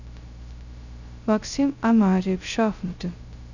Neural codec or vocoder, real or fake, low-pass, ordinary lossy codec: codec, 16 kHz, 0.2 kbps, FocalCodec; fake; 7.2 kHz; none